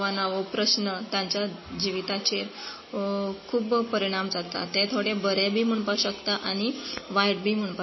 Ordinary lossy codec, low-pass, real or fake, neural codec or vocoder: MP3, 24 kbps; 7.2 kHz; real; none